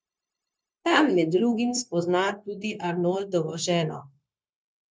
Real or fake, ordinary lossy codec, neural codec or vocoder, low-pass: fake; none; codec, 16 kHz, 0.9 kbps, LongCat-Audio-Codec; none